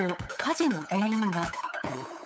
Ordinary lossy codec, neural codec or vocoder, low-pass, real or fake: none; codec, 16 kHz, 8 kbps, FunCodec, trained on LibriTTS, 25 frames a second; none; fake